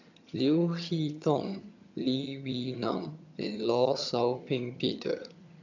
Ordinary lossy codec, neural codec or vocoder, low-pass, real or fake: none; vocoder, 22.05 kHz, 80 mel bands, HiFi-GAN; 7.2 kHz; fake